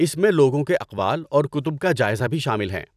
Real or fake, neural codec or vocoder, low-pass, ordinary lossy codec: fake; vocoder, 44.1 kHz, 128 mel bands every 256 samples, BigVGAN v2; 14.4 kHz; none